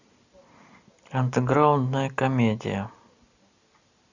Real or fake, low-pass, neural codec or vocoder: real; 7.2 kHz; none